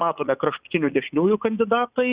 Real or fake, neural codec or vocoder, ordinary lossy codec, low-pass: fake; vocoder, 44.1 kHz, 80 mel bands, Vocos; Opus, 64 kbps; 3.6 kHz